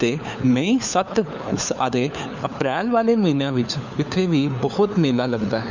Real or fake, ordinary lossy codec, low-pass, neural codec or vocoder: fake; none; 7.2 kHz; codec, 16 kHz, 4 kbps, FunCodec, trained on LibriTTS, 50 frames a second